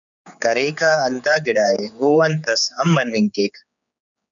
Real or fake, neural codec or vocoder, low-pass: fake; codec, 16 kHz, 4 kbps, X-Codec, HuBERT features, trained on general audio; 7.2 kHz